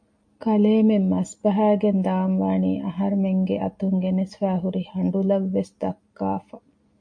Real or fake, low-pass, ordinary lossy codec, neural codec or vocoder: real; 9.9 kHz; MP3, 48 kbps; none